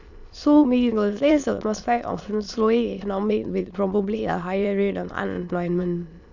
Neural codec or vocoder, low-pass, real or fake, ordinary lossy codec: autoencoder, 22.05 kHz, a latent of 192 numbers a frame, VITS, trained on many speakers; 7.2 kHz; fake; none